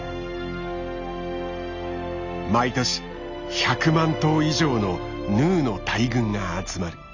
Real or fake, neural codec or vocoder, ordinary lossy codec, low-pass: real; none; none; 7.2 kHz